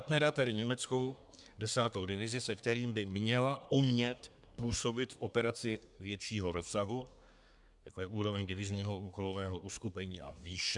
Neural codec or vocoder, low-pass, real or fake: codec, 24 kHz, 1 kbps, SNAC; 10.8 kHz; fake